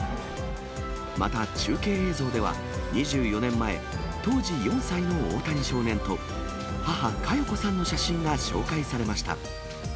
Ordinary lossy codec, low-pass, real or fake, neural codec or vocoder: none; none; real; none